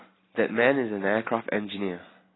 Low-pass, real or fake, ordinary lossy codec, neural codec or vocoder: 7.2 kHz; real; AAC, 16 kbps; none